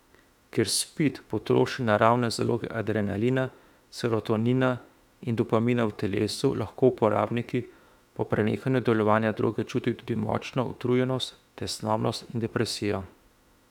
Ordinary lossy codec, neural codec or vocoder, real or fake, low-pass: none; autoencoder, 48 kHz, 32 numbers a frame, DAC-VAE, trained on Japanese speech; fake; 19.8 kHz